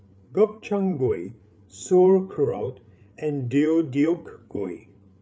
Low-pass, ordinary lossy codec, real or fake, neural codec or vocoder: none; none; fake; codec, 16 kHz, 8 kbps, FreqCodec, larger model